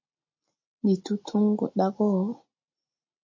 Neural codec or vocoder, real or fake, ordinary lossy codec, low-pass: none; real; MP3, 32 kbps; 7.2 kHz